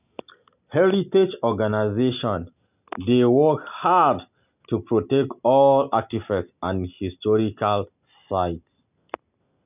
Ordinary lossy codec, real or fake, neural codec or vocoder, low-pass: none; real; none; 3.6 kHz